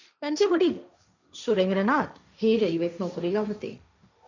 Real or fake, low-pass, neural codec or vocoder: fake; 7.2 kHz; codec, 16 kHz, 1.1 kbps, Voila-Tokenizer